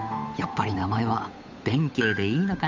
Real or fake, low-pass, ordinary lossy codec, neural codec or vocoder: fake; 7.2 kHz; MP3, 64 kbps; codec, 16 kHz, 8 kbps, FunCodec, trained on Chinese and English, 25 frames a second